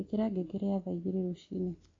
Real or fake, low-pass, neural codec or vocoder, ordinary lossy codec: real; 7.2 kHz; none; AAC, 32 kbps